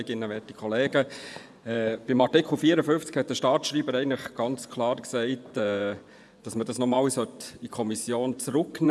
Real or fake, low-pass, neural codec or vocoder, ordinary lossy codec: fake; none; vocoder, 24 kHz, 100 mel bands, Vocos; none